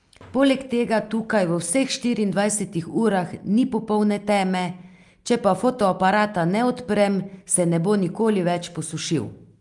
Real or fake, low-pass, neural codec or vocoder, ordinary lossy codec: real; 10.8 kHz; none; Opus, 32 kbps